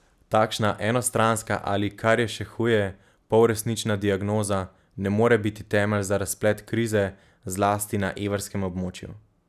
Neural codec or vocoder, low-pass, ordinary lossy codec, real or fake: none; 14.4 kHz; none; real